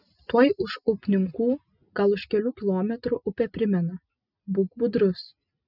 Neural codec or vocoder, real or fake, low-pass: none; real; 5.4 kHz